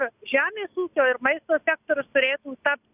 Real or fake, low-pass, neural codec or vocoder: real; 3.6 kHz; none